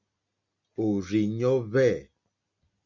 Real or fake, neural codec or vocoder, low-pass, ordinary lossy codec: real; none; 7.2 kHz; Opus, 64 kbps